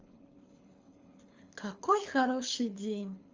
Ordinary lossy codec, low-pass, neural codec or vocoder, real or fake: Opus, 32 kbps; 7.2 kHz; codec, 24 kHz, 6 kbps, HILCodec; fake